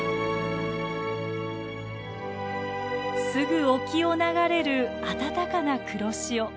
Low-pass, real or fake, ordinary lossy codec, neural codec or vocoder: none; real; none; none